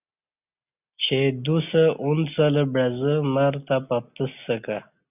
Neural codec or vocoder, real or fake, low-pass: none; real; 3.6 kHz